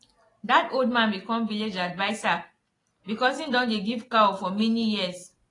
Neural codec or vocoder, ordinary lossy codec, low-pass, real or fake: none; AAC, 32 kbps; 10.8 kHz; real